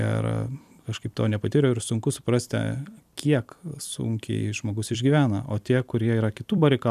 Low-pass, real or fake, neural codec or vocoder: 14.4 kHz; fake; vocoder, 44.1 kHz, 128 mel bands every 256 samples, BigVGAN v2